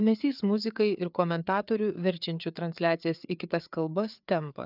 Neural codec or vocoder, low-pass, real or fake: codec, 16 kHz, 4 kbps, FreqCodec, larger model; 5.4 kHz; fake